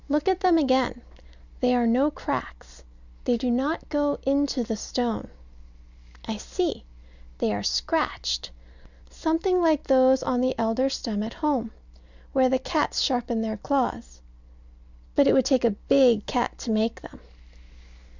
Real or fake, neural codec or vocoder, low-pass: real; none; 7.2 kHz